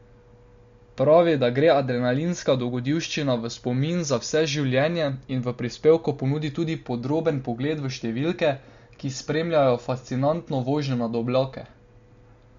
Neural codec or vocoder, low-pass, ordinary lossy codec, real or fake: none; 7.2 kHz; MP3, 48 kbps; real